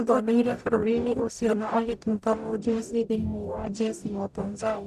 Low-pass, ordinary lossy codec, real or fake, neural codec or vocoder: 14.4 kHz; none; fake; codec, 44.1 kHz, 0.9 kbps, DAC